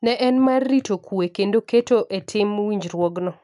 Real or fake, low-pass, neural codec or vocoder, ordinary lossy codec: real; 10.8 kHz; none; none